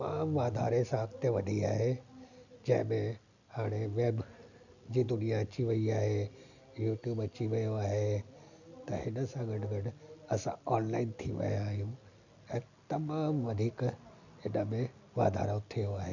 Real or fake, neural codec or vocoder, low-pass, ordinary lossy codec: real; none; 7.2 kHz; none